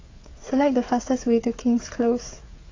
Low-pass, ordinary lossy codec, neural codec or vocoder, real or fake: 7.2 kHz; AAC, 32 kbps; codec, 16 kHz, 8 kbps, FreqCodec, smaller model; fake